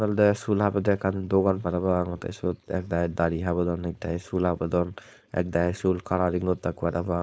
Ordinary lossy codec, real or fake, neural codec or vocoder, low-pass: none; fake; codec, 16 kHz, 4.8 kbps, FACodec; none